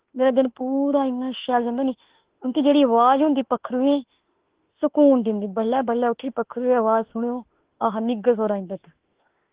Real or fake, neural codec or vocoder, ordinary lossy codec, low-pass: fake; autoencoder, 48 kHz, 32 numbers a frame, DAC-VAE, trained on Japanese speech; Opus, 16 kbps; 3.6 kHz